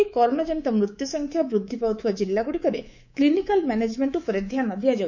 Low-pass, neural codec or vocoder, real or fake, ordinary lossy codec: 7.2 kHz; codec, 24 kHz, 3.1 kbps, DualCodec; fake; none